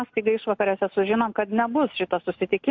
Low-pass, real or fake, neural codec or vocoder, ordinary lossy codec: 7.2 kHz; real; none; MP3, 48 kbps